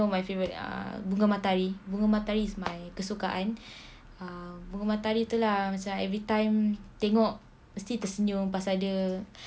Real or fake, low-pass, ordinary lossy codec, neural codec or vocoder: real; none; none; none